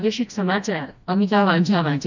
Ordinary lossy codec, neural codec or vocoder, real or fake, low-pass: none; codec, 16 kHz, 1 kbps, FreqCodec, smaller model; fake; 7.2 kHz